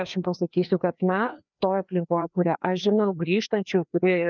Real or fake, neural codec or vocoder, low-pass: fake; codec, 16 kHz, 2 kbps, FreqCodec, larger model; 7.2 kHz